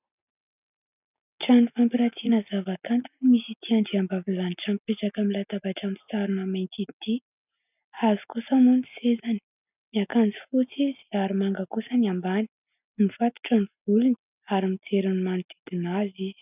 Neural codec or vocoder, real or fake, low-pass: none; real; 3.6 kHz